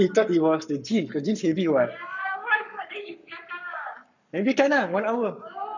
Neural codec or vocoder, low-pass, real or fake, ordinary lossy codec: codec, 44.1 kHz, 7.8 kbps, Pupu-Codec; 7.2 kHz; fake; none